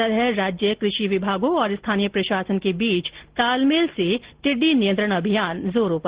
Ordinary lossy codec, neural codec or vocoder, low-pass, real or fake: Opus, 16 kbps; none; 3.6 kHz; real